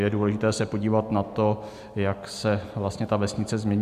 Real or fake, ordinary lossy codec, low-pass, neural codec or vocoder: real; AAC, 96 kbps; 14.4 kHz; none